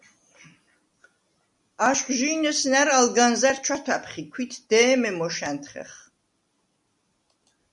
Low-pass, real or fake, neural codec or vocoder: 10.8 kHz; real; none